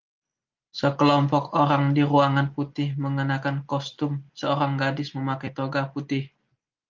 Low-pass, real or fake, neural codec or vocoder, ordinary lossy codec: 7.2 kHz; real; none; Opus, 24 kbps